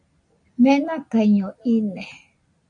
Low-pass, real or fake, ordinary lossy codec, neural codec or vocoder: 9.9 kHz; real; AAC, 64 kbps; none